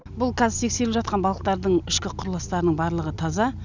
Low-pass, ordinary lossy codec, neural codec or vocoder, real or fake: 7.2 kHz; none; none; real